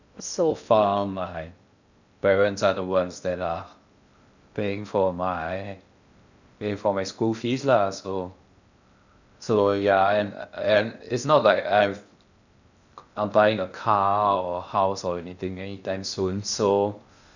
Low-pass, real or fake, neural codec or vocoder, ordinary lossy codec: 7.2 kHz; fake; codec, 16 kHz in and 24 kHz out, 0.6 kbps, FocalCodec, streaming, 2048 codes; none